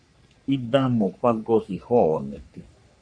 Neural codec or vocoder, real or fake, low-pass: codec, 44.1 kHz, 3.4 kbps, Pupu-Codec; fake; 9.9 kHz